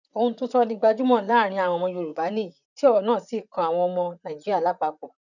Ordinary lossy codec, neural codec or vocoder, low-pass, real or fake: none; vocoder, 44.1 kHz, 128 mel bands, Pupu-Vocoder; 7.2 kHz; fake